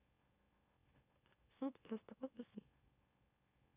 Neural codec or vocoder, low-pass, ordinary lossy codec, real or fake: autoencoder, 44.1 kHz, a latent of 192 numbers a frame, MeloTTS; 3.6 kHz; none; fake